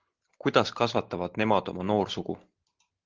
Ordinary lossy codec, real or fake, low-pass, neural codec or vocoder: Opus, 16 kbps; real; 7.2 kHz; none